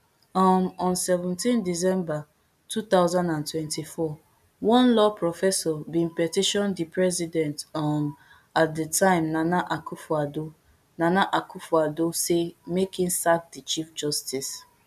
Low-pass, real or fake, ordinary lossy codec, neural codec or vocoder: 14.4 kHz; real; none; none